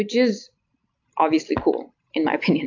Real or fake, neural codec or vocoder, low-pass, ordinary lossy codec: real; none; 7.2 kHz; AAC, 48 kbps